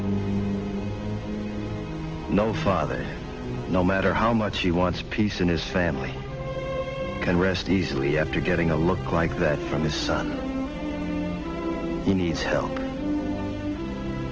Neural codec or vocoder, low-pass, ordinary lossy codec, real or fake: none; 7.2 kHz; Opus, 16 kbps; real